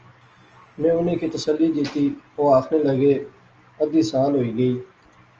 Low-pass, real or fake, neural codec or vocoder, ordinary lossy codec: 7.2 kHz; real; none; Opus, 24 kbps